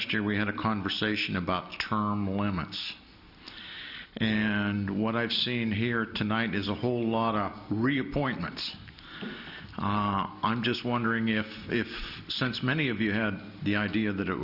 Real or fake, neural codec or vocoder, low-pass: real; none; 5.4 kHz